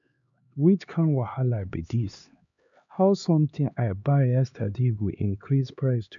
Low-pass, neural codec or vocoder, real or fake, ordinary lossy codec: 7.2 kHz; codec, 16 kHz, 2 kbps, X-Codec, HuBERT features, trained on LibriSpeech; fake; none